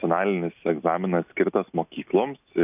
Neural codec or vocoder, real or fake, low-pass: none; real; 3.6 kHz